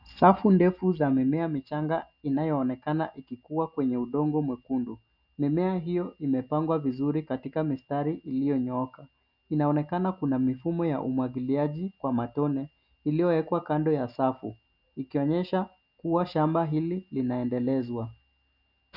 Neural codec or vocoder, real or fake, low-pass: none; real; 5.4 kHz